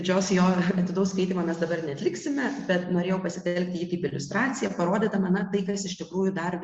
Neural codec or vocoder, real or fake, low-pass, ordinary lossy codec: none; real; 9.9 kHz; Opus, 64 kbps